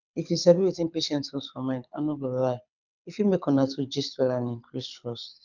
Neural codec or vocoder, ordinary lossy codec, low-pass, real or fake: codec, 24 kHz, 6 kbps, HILCodec; none; 7.2 kHz; fake